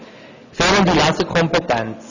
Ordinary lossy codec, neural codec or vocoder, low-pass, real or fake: none; none; 7.2 kHz; real